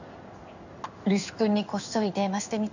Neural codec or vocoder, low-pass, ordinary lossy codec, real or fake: codec, 16 kHz in and 24 kHz out, 1 kbps, XY-Tokenizer; 7.2 kHz; none; fake